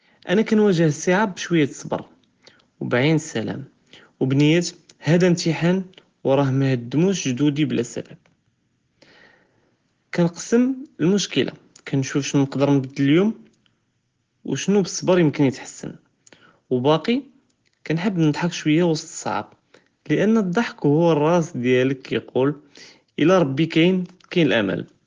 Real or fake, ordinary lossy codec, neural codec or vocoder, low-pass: real; Opus, 16 kbps; none; 7.2 kHz